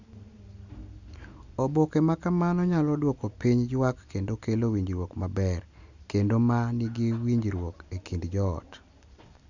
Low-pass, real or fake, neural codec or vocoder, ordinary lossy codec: 7.2 kHz; real; none; none